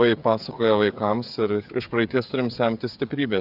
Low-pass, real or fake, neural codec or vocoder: 5.4 kHz; fake; codec, 16 kHz, 8 kbps, FreqCodec, smaller model